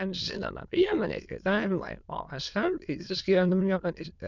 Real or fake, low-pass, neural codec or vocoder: fake; 7.2 kHz; autoencoder, 22.05 kHz, a latent of 192 numbers a frame, VITS, trained on many speakers